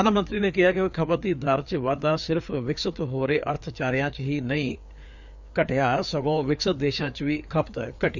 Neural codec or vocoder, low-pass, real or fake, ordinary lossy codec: codec, 16 kHz, 4 kbps, FreqCodec, larger model; 7.2 kHz; fake; none